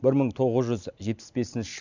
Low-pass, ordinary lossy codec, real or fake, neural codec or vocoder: 7.2 kHz; none; real; none